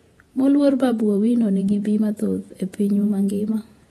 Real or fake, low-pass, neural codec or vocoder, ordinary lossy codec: fake; 19.8 kHz; vocoder, 48 kHz, 128 mel bands, Vocos; AAC, 32 kbps